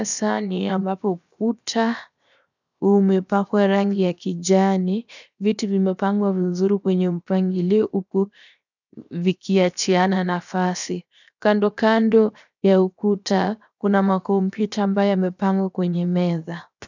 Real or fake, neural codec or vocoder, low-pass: fake; codec, 16 kHz, 0.7 kbps, FocalCodec; 7.2 kHz